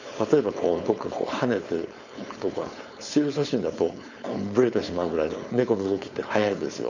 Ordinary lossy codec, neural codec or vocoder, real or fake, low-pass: none; codec, 16 kHz, 4.8 kbps, FACodec; fake; 7.2 kHz